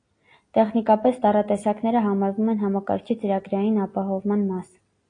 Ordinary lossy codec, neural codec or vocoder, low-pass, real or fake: AAC, 32 kbps; none; 9.9 kHz; real